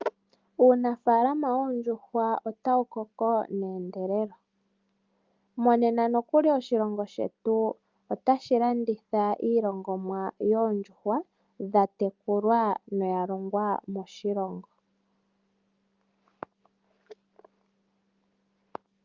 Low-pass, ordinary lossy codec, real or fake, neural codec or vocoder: 7.2 kHz; Opus, 24 kbps; real; none